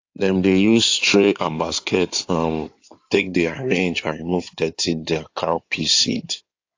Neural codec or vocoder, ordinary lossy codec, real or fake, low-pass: codec, 16 kHz in and 24 kHz out, 2.2 kbps, FireRedTTS-2 codec; AAC, 48 kbps; fake; 7.2 kHz